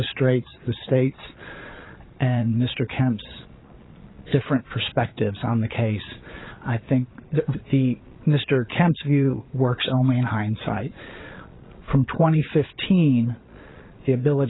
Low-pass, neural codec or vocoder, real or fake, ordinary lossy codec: 7.2 kHz; vocoder, 22.05 kHz, 80 mel bands, Vocos; fake; AAC, 16 kbps